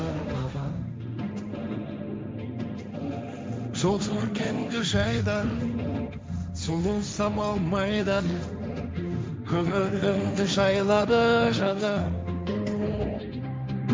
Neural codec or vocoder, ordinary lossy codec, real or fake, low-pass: codec, 16 kHz, 1.1 kbps, Voila-Tokenizer; none; fake; none